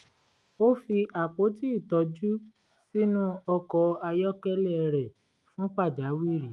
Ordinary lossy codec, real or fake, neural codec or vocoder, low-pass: Opus, 32 kbps; fake; autoencoder, 48 kHz, 128 numbers a frame, DAC-VAE, trained on Japanese speech; 10.8 kHz